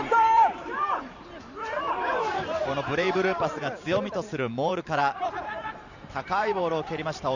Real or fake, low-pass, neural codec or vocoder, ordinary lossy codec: fake; 7.2 kHz; vocoder, 44.1 kHz, 128 mel bands every 512 samples, BigVGAN v2; none